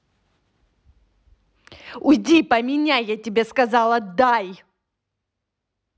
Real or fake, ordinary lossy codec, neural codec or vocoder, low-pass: real; none; none; none